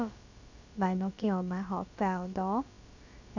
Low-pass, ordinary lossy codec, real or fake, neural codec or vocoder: 7.2 kHz; none; fake; codec, 16 kHz, about 1 kbps, DyCAST, with the encoder's durations